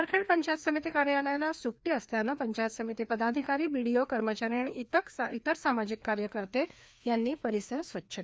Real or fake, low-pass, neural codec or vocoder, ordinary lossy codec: fake; none; codec, 16 kHz, 2 kbps, FreqCodec, larger model; none